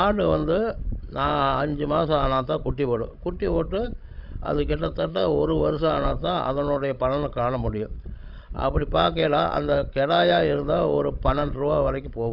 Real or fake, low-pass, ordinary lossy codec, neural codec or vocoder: fake; 5.4 kHz; none; codec, 16 kHz, 16 kbps, FreqCodec, larger model